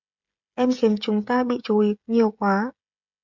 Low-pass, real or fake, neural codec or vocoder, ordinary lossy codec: 7.2 kHz; fake; codec, 16 kHz, 16 kbps, FreqCodec, smaller model; MP3, 64 kbps